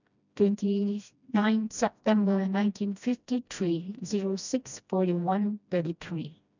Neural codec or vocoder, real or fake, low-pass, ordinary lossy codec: codec, 16 kHz, 1 kbps, FreqCodec, smaller model; fake; 7.2 kHz; none